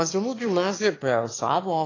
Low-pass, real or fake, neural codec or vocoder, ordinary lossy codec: 7.2 kHz; fake; autoencoder, 22.05 kHz, a latent of 192 numbers a frame, VITS, trained on one speaker; AAC, 32 kbps